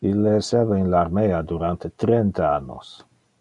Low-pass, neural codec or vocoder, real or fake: 10.8 kHz; none; real